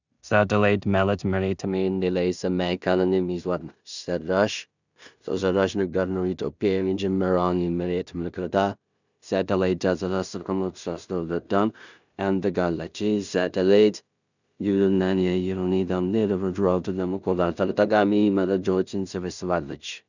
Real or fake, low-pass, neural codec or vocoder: fake; 7.2 kHz; codec, 16 kHz in and 24 kHz out, 0.4 kbps, LongCat-Audio-Codec, two codebook decoder